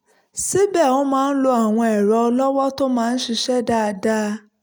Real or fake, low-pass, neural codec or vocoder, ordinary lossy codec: real; none; none; none